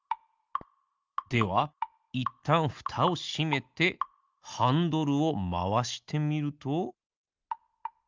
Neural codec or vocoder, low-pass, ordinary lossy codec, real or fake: none; 7.2 kHz; Opus, 24 kbps; real